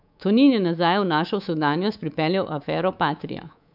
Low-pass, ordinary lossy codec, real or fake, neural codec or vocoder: 5.4 kHz; none; fake; codec, 24 kHz, 3.1 kbps, DualCodec